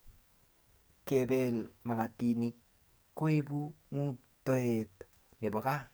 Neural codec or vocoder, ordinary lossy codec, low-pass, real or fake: codec, 44.1 kHz, 2.6 kbps, SNAC; none; none; fake